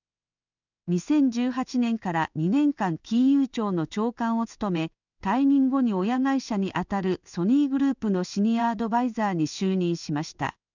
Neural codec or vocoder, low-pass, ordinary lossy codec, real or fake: codec, 16 kHz in and 24 kHz out, 1 kbps, XY-Tokenizer; 7.2 kHz; none; fake